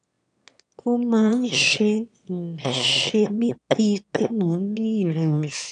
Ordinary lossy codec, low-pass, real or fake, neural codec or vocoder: none; 9.9 kHz; fake; autoencoder, 22.05 kHz, a latent of 192 numbers a frame, VITS, trained on one speaker